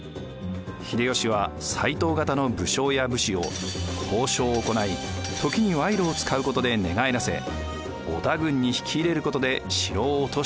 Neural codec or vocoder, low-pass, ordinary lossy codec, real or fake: none; none; none; real